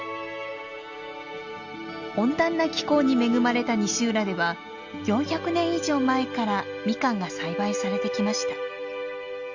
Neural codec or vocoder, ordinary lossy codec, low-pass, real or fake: none; Opus, 64 kbps; 7.2 kHz; real